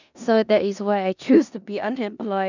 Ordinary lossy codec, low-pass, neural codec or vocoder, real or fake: none; 7.2 kHz; codec, 16 kHz in and 24 kHz out, 0.9 kbps, LongCat-Audio-Codec, four codebook decoder; fake